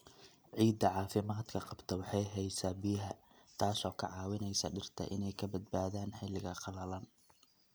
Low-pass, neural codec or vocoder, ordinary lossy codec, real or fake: none; none; none; real